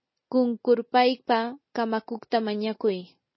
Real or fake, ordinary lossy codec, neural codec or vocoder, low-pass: real; MP3, 24 kbps; none; 7.2 kHz